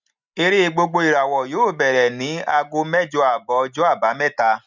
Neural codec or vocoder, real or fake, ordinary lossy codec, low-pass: none; real; none; 7.2 kHz